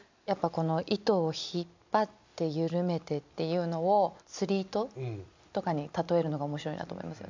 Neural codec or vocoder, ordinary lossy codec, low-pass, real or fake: none; MP3, 64 kbps; 7.2 kHz; real